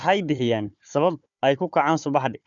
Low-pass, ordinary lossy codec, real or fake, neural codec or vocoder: 7.2 kHz; none; fake; codec, 16 kHz, 4 kbps, FunCodec, trained on Chinese and English, 50 frames a second